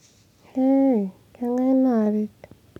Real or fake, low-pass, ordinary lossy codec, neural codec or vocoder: real; 19.8 kHz; none; none